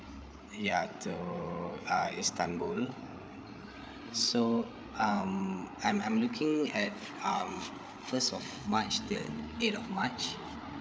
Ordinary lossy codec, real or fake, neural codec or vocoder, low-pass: none; fake; codec, 16 kHz, 8 kbps, FreqCodec, larger model; none